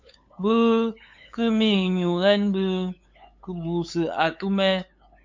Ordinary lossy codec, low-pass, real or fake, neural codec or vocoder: MP3, 64 kbps; 7.2 kHz; fake; codec, 16 kHz, 8 kbps, FunCodec, trained on LibriTTS, 25 frames a second